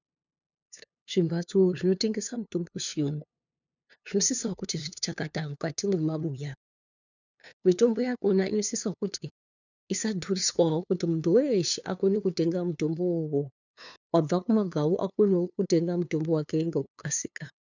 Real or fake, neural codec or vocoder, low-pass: fake; codec, 16 kHz, 2 kbps, FunCodec, trained on LibriTTS, 25 frames a second; 7.2 kHz